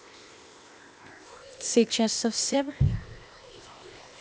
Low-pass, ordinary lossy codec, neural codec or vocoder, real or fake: none; none; codec, 16 kHz, 0.8 kbps, ZipCodec; fake